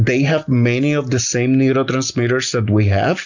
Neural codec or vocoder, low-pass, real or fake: none; 7.2 kHz; real